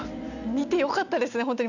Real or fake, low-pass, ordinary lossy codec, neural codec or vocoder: real; 7.2 kHz; none; none